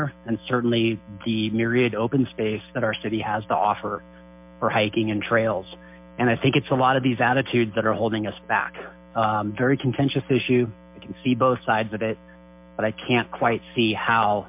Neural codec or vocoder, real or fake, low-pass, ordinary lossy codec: none; real; 3.6 kHz; MP3, 32 kbps